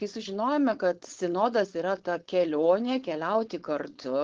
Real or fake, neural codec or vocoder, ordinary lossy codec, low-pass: fake; codec, 16 kHz, 4.8 kbps, FACodec; Opus, 16 kbps; 7.2 kHz